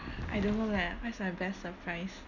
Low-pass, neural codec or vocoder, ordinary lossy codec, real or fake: 7.2 kHz; none; none; real